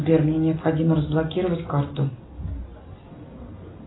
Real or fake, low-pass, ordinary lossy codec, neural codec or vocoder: real; 7.2 kHz; AAC, 16 kbps; none